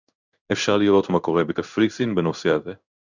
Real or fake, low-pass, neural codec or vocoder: fake; 7.2 kHz; codec, 16 kHz in and 24 kHz out, 1 kbps, XY-Tokenizer